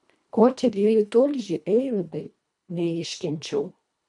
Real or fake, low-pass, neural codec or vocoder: fake; 10.8 kHz; codec, 24 kHz, 1.5 kbps, HILCodec